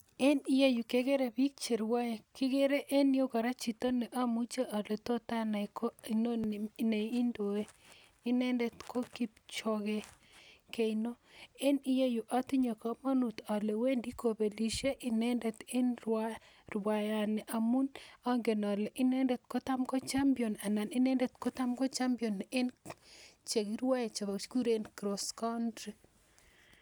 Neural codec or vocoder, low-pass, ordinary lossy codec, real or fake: none; none; none; real